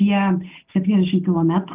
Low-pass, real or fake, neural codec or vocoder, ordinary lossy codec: 3.6 kHz; real; none; Opus, 24 kbps